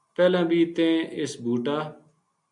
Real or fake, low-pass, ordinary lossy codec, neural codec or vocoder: real; 10.8 kHz; MP3, 96 kbps; none